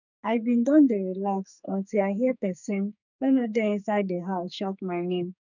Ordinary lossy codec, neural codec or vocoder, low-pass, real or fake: none; codec, 44.1 kHz, 2.6 kbps, SNAC; 7.2 kHz; fake